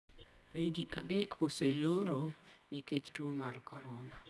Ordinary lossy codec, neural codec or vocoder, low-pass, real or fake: none; codec, 24 kHz, 0.9 kbps, WavTokenizer, medium music audio release; none; fake